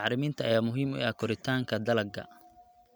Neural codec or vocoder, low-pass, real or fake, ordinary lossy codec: none; none; real; none